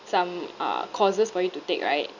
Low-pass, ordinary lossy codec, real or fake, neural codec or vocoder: 7.2 kHz; none; real; none